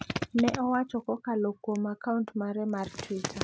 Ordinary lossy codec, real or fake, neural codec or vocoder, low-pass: none; real; none; none